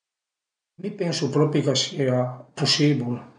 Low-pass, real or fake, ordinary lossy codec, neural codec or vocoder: 9.9 kHz; real; MP3, 64 kbps; none